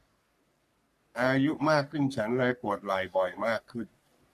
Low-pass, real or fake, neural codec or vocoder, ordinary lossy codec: 14.4 kHz; fake; codec, 44.1 kHz, 3.4 kbps, Pupu-Codec; MP3, 64 kbps